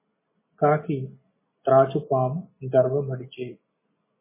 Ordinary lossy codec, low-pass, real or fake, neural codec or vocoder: MP3, 16 kbps; 3.6 kHz; real; none